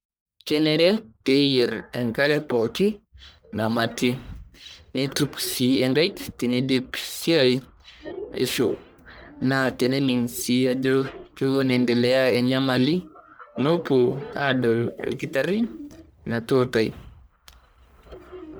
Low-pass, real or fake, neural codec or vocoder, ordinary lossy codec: none; fake; codec, 44.1 kHz, 1.7 kbps, Pupu-Codec; none